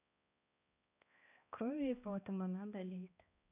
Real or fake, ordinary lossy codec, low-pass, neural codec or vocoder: fake; none; 3.6 kHz; codec, 16 kHz, 1 kbps, X-Codec, HuBERT features, trained on balanced general audio